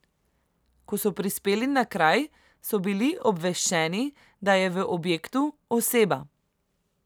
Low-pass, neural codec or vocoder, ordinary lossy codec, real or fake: none; none; none; real